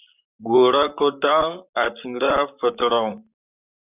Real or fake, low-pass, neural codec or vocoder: fake; 3.6 kHz; vocoder, 44.1 kHz, 128 mel bands, Pupu-Vocoder